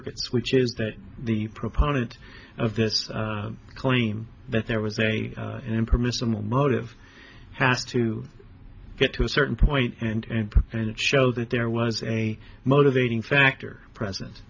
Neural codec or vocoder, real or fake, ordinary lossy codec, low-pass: none; real; AAC, 48 kbps; 7.2 kHz